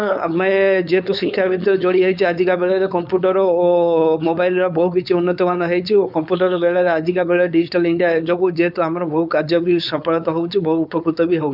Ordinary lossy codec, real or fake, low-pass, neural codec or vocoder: none; fake; 5.4 kHz; codec, 16 kHz, 4.8 kbps, FACodec